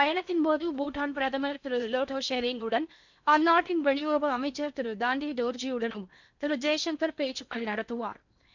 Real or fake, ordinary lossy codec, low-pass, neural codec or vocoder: fake; none; 7.2 kHz; codec, 16 kHz in and 24 kHz out, 0.8 kbps, FocalCodec, streaming, 65536 codes